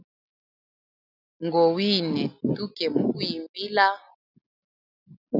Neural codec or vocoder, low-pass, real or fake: none; 5.4 kHz; real